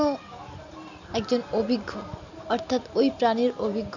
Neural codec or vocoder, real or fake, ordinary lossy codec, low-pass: none; real; none; 7.2 kHz